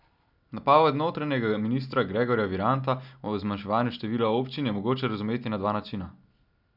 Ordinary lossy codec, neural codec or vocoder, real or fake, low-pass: none; none; real; 5.4 kHz